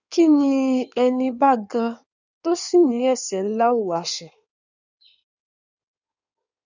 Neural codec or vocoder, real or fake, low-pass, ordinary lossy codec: codec, 16 kHz in and 24 kHz out, 1.1 kbps, FireRedTTS-2 codec; fake; 7.2 kHz; none